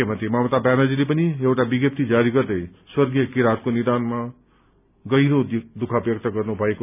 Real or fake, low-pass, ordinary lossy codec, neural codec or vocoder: real; 3.6 kHz; none; none